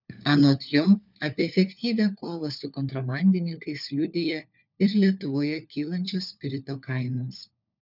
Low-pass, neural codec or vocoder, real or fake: 5.4 kHz; codec, 16 kHz, 4 kbps, FunCodec, trained on LibriTTS, 50 frames a second; fake